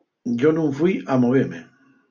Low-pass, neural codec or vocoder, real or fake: 7.2 kHz; none; real